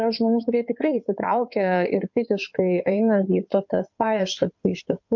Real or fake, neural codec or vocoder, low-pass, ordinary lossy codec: fake; codec, 16 kHz in and 24 kHz out, 2.2 kbps, FireRedTTS-2 codec; 7.2 kHz; MP3, 64 kbps